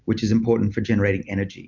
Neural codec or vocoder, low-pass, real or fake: none; 7.2 kHz; real